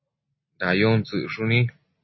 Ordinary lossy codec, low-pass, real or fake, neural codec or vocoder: MP3, 24 kbps; 7.2 kHz; real; none